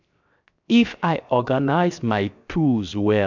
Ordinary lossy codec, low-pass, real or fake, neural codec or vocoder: none; 7.2 kHz; fake; codec, 16 kHz, 0.7 kbps, FocalCodec